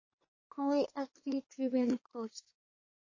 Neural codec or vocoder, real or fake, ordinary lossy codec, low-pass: codec, 24 kHz, 1 kbps, SNAC; fake; MP3, 32 kbps; 7.2 kHz